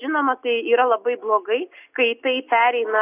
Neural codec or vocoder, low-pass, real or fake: none; 3.6 kHz; real